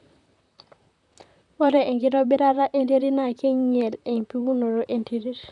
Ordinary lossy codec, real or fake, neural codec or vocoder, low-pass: none; fake; vocoder, 44.1 kHz, 128 mel bands, Pupu-Vocoder; 10.8 kHz